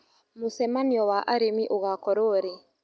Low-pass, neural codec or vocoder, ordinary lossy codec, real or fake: none; none; none; real